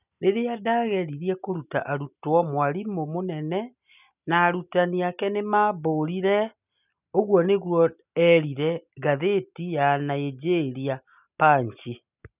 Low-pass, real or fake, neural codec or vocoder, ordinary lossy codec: 3.6 kHz; real; none; none